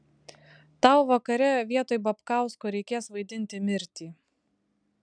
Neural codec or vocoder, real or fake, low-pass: none; real; 9.9 kHz